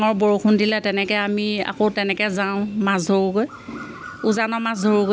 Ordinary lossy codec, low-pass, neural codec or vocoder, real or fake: none; none; none; real